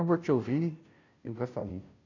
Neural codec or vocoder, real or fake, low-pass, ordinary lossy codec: codec, 16 kHz, 0.5 kbps, FunCodec, trained on Chinese and English, 25 frames a second; fake; 7.2 kHz; none